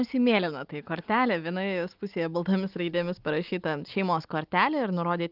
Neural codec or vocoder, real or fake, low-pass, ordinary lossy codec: none; real; 5.4 kHz; Opus, 32 kbps